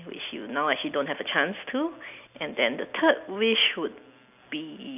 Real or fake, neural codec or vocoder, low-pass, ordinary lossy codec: real; none; 3.6 kHz; none